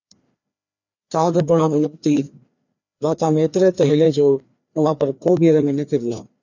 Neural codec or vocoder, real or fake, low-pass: codec, 16 kHz, 2 kbps, FreqCodec, larger model; fake; 7.2 kHz